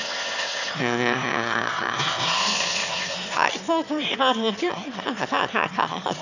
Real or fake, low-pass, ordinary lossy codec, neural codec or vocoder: fake; 7.2 kHz; none; autoencoder, 22.05 kHz, a latent of 192 numbers a frame, VITS, trained on one speaker